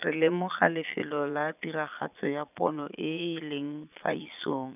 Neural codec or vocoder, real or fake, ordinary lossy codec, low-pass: vocoder, 22.05 kHz, 80 mel bands, Vocos; fake; none; 3.6 kHz